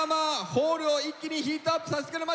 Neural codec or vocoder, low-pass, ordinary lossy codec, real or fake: none; none; none; real